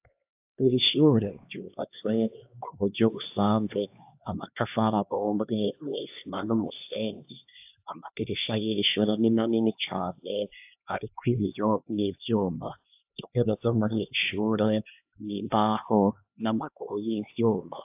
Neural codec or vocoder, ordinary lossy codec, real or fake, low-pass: codec, 16 kHz, 2 kbps, X-Codec, HuBERT features, trained on LibriSpeech; AAC, 32 kbps; fake; 3.6 kHz